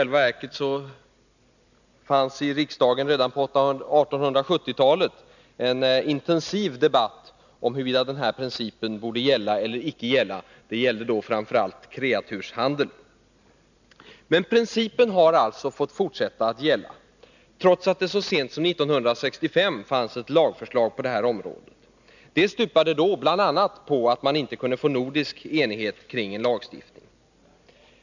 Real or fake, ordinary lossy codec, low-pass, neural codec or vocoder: real; none; 7.2 kHz; none